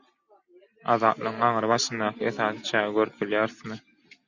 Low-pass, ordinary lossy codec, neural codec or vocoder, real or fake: 7.2 kHz; Opus, 64 kbps; none; real